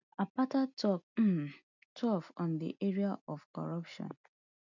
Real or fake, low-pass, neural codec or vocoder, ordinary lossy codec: real; 7.2 kHz; none; none